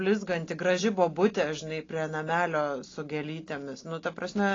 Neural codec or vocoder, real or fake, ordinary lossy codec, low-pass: none; real; AAC, 32 kbps; 7.2 kHz